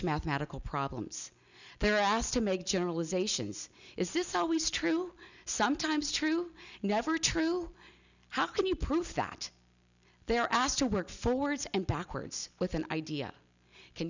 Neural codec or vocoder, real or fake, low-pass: none; real; 7.2 kHz